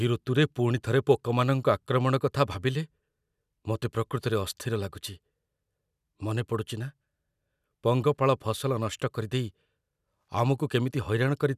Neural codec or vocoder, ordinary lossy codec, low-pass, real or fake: none; none; 14.4 kHz; real